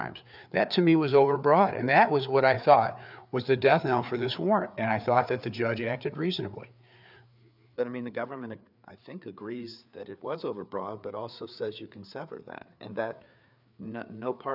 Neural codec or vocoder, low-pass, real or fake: codec, 16 kHz, 4 kbps, FreqCodec, larger model; 5.4 kHz; fake